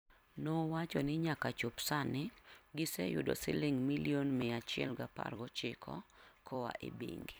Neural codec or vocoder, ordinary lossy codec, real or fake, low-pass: vocoder, 44.1 kHz, 128 mel bands every 256 samples, BigVGAN v2; none; fake; none